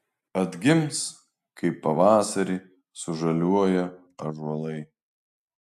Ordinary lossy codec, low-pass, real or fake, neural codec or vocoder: AAC, 96 kbps; 14.4 kHz; real; none